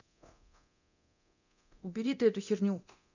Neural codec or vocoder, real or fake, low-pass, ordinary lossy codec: codec, 24 kHz, 0.9 kbps, DualCodec; fake; 7.2 kHz; none